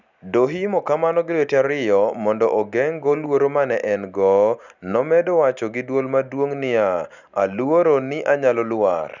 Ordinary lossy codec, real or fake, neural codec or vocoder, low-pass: none; real; none; 7.2 kHz